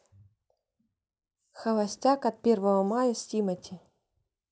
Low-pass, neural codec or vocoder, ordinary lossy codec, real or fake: none; none; none; real